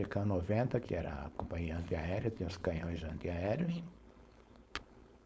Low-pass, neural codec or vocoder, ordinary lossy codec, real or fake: none; codec, 16 kHz, 4.8 kbps, FACodec; none; fake